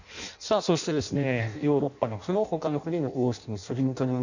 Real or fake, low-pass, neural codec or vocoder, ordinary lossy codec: fake; 7.2 kHz; codec, 16 kHz in and 24 kHz out, 0.6 kbps, FireRedTTS-2 codec; none